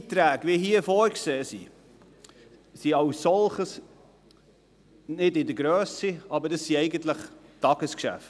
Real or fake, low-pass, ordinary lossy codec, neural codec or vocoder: real; none; none; none